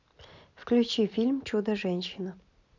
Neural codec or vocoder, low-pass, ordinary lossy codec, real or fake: none; 7.2 kHz; none; real